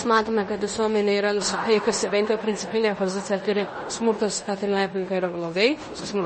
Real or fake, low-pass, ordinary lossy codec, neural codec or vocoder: fake; 10.8 kHz; MP3, 32 kbps; codec, 16 kHz in and 24 kHz out, 0.9 kbps, LongCat-Audio-Codec, fine tuned four codebook decoder